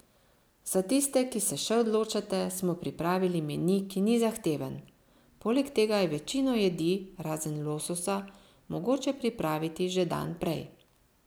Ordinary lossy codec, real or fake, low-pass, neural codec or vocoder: none; real; none; none